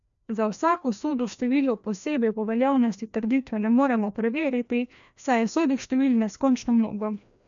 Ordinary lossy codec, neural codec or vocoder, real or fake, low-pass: none; codec, 16 kHz, 1 kbps, FreqCodec, larger model; fake; 7.2 kHz